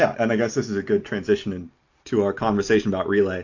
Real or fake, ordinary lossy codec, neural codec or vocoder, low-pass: real; AAC, 48 kbps; none; 7.2 kHz